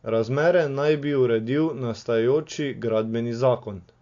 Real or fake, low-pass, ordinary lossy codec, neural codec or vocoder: real; 7.2 kHz; AAC, 48 kbps; none